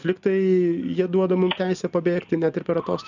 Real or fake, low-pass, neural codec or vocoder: real; 7.2 kHz; none